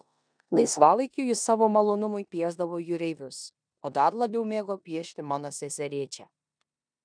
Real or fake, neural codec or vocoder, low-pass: fake; codec, 16 kHz in and 24 kHz out, 0.9 kbps, LongCat-Audio-Codec, four codebook decoder; 9.9 kHz